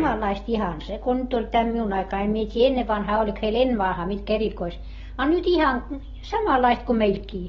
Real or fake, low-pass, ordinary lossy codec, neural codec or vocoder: real; 7.2 kHz; AAC, 24 kbps; none